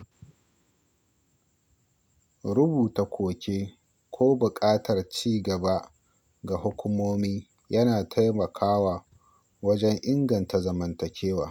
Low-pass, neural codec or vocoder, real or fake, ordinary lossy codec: 19.8 kHz; none; real; none